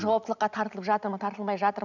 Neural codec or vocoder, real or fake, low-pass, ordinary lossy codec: none; real; 7.2 kHz; none